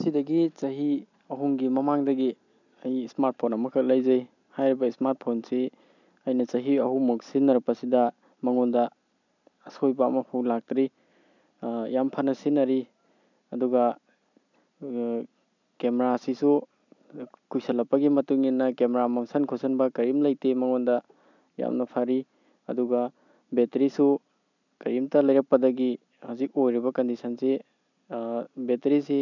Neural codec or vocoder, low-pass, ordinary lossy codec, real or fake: none; 7.2 kHz; none; real